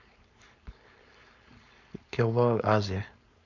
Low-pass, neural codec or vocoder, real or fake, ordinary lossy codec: 7.2 kHz; codec, 16 kHz, 4.8 kbps, FACodec; fake; none